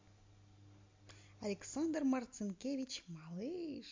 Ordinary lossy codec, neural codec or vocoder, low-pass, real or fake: MP3, 48 kbps; none; 7.2 kHz; real